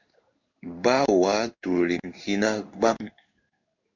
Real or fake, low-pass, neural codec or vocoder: fake; 7.2 kHz; codec, 16 kHz in and 24 kHz out, 1 kbps, XY-Tokenizer